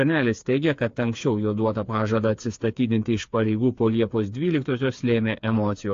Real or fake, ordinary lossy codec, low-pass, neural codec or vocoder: fake; AAC, 48 kbps; 7.2 kHz; codec, 16 kHz, 4 kbps, FreqCodec, smaller model